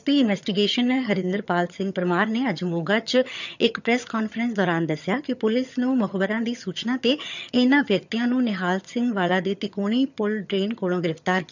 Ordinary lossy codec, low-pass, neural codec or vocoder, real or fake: none; 7.2 kHz; vocoder, 22.05 kHz, 80 mel bands, HiFi-GAN; fake